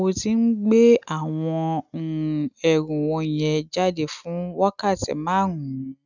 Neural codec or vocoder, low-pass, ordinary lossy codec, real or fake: none; 7.2 kHz; none; real